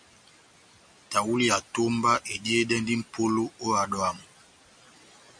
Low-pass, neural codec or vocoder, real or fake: 9.9 kHz; none; real